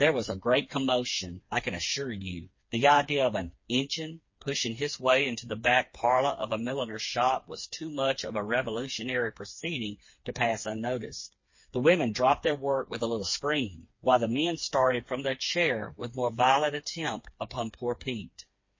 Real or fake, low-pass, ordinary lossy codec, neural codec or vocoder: fake; 7.2 kHz; MP3, 32 kbps; codec, 16 kHz, 4 kbps, FreqCodec, smaller model